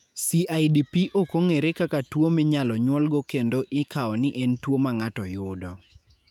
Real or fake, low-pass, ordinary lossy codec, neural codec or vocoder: fake; 19.8 kHz; none; autoencoder, 48 kHz, 128 numbers a frame, DAC-VAE, trained on Japanese speech